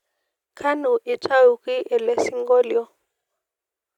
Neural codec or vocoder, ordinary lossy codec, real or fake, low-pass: none; none; real; 19.8 kHz